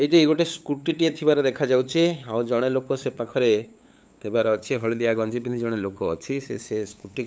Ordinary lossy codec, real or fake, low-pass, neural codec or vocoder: none; fake; none; codec, 16 kHz, 8 kbps, FunCodec, trained on LibriTTS, 25 frames a second